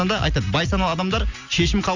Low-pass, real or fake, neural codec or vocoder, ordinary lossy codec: 7.2 kHz; real; none; none